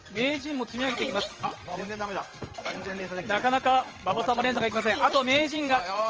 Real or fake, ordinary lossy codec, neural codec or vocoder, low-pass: fake; Opus, 24 kbps; vocoder, 22.05 kHz, 80 mel bands, WaveNeXt; 7.2 kHz